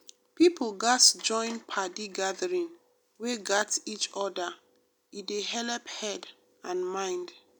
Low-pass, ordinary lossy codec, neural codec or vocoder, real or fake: none; none; none; real